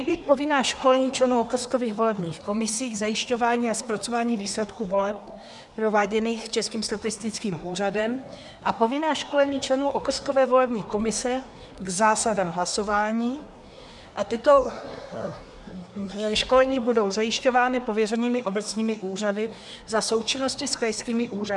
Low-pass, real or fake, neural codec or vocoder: 10.8 kHz; fake; codec, 24 kHz, 1 kbps, SNAC